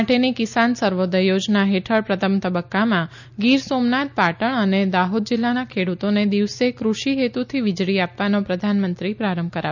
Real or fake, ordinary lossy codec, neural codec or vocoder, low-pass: real; none; none; 7.2 kHz